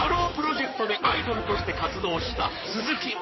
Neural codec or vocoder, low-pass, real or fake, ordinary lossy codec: codec, 16 kHz in and 24 kHz out, 2.2 kbps, FireRedTTS-2 codec; 7.2 kHz; fake; MP3, 24 kbps